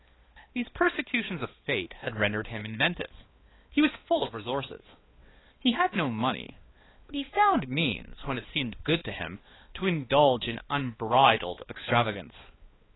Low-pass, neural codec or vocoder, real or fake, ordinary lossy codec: 7.2 kHz; codec, 16 kHz, 2 kbps, X-Codec, HuBERT features, trained on balanced general audio; fake; AAC, 16 kbps